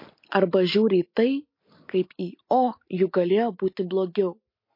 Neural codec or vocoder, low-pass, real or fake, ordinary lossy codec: none; 5.4 kHz; real; MP3, 32 kbps